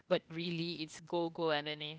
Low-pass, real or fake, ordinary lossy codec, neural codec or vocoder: none; fake; none; codec, 16 kHz, 0.8 kbps, ZipCodec